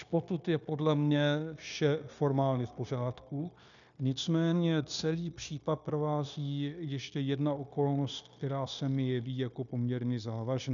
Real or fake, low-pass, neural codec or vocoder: fake; 7.2 kHz; codec, 16 kHz, 0.9 kbps, LongCat-Audio-Codec